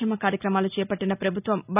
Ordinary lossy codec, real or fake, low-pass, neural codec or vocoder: none; real; 3.6 kHz; none